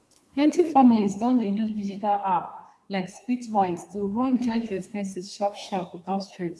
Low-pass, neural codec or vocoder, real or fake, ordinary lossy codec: none; codec, 24 kHz, 1 kbps, SNAC; fake; none